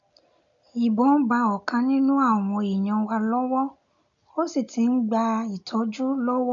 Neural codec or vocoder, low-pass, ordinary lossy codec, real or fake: none; 7.2 kHz; none; real